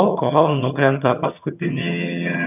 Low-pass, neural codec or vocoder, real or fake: 3.6 kHz; vocoder, 22.05 kHz, 80 mel bands, HiFi-GAN; fake